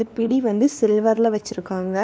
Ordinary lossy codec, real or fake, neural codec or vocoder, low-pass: none; fake; codec, 16 kHz, 2 kbps, X-Codec, WavLM features, trained on Multilingual LibriSpeech; none